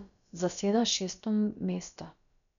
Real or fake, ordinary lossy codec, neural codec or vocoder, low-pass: fake; none; codec, 16 kHz, about 1 kbps, DyCAST, with the encoder's durations; 7.2 kHz